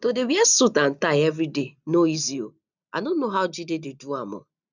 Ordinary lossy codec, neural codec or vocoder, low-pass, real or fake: none; none; 7.2 kHz; real